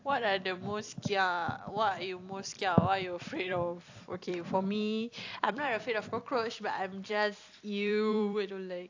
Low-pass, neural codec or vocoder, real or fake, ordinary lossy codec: 7.2 kHz; vocoder, 44.1 kHz, 128 mel bands every 256 samples, BigVGAN v2; fake; AAC, 48 kbps